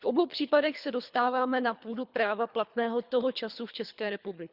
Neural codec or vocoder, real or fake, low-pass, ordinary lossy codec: codec, 24 kHz, 3 kbps, HILCodec; fake; 5.4 kHz; Opus, 64 kbps